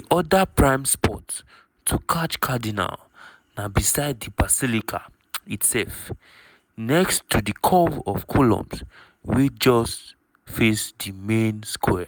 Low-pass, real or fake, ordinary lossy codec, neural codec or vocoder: none; real; none; none